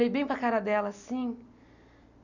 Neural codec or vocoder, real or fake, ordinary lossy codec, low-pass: none; real; none; 7.2 kHz